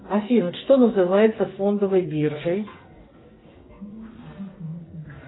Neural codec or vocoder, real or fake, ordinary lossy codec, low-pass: codec, 44.1 kHz, 2.6 kbps, SNAC; fake; AAC, 16 kbps; 7.2 kHz